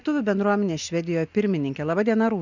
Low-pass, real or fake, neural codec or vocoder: 7.2 kHz; real; none